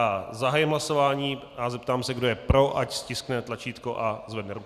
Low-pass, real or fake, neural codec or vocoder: 14.4 kHz; real; none